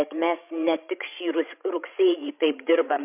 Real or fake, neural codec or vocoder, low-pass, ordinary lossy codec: fake; codec, 16 kHz, 16 kbps, FreqCodec, larger model; 3.6 kHz; MP3, 32 kbps